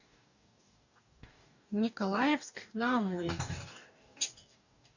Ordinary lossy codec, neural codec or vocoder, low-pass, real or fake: none; codec, 44.1 kHz, 2.6 kbps, DAC; 7.2 kHz; fake